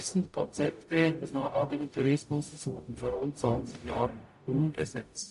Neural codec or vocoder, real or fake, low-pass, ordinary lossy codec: codec, 44.1 kHz, 0.9 kbps, DAC; fake; 14.4 kHz; MP3, 48 kbps